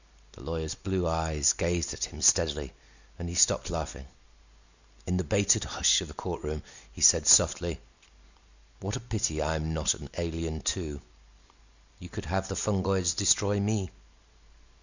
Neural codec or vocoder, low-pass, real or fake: none; 7.2 kHz; real